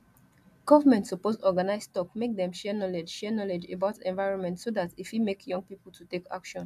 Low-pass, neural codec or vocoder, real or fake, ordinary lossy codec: 14.4 kHz; none; real; AAC, 96 kbps